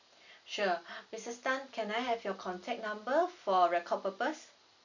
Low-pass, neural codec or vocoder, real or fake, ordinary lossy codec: 7.2 kHz; none; real; none